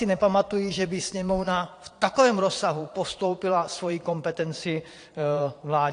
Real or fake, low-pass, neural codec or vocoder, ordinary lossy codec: fake; 9.9 kHz; vocoder, 22.05 kHz, 80 mel bands, Vocos; AAC, 48 kbps